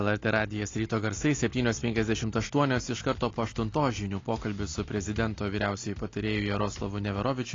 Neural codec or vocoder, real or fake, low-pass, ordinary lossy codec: none; real; 7.2 kHz; AAC, 32 kbps